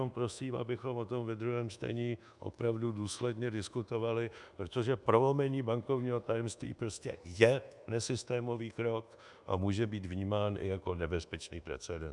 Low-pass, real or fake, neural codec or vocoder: 10.8 kHz; fake; codec, 24 kHz, 1.2 kbps, DualCodec